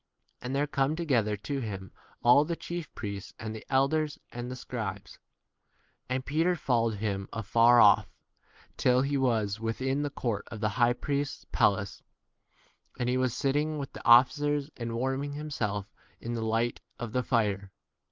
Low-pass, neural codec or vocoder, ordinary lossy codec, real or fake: 7.2 kHz; none; Opus, 32 kbps; real